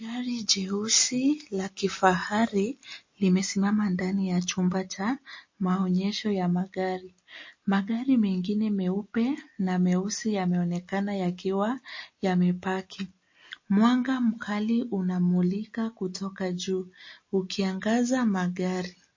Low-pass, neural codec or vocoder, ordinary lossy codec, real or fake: 7.2 kHz; none; MP3, 32 kbps; real